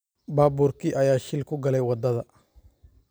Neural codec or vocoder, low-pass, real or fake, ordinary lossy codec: none; none; real; none